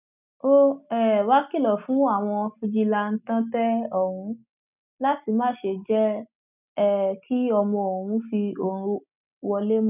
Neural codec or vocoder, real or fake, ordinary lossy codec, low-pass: none; real; none; 3.6 kHz